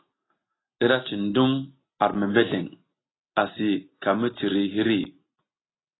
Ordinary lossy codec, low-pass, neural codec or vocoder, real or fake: AAC, 16 kbps; 7.2 kHz; none; real